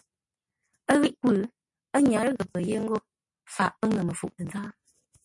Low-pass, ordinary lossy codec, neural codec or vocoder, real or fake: 10.8 kHz; MP3, 48 kbps; none; real